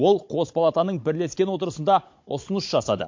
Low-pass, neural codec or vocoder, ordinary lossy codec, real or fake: 7.2 kHz; codec, 16 kHz, 16 kbps, FunCodec, trained on Chinese and English, 50 frames a second; MP3, 48 kbps; fake